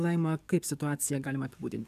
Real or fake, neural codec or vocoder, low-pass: fake; codec, 44.1 kHz, 7.8 kbps, DAC; 14.4 kHz